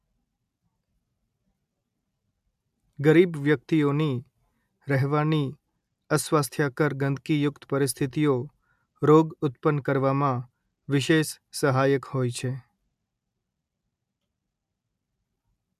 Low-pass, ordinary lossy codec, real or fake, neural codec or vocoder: 14.4 kHz; MP3, 96 kbps; real; none